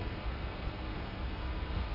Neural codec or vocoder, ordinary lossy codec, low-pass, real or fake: codec, 32 kHz, 1.9 kbps, SNAC; none; 5.4 kHz; fake